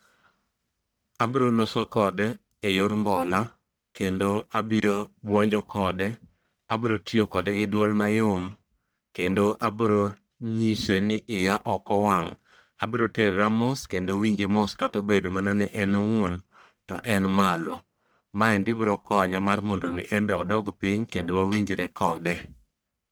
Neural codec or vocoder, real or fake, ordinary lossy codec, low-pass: codec, 44.1 kHz, 1.7 kbps, Pupu-Codec; fake; none; none